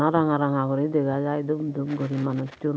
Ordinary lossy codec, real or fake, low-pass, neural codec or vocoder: none; real; none; none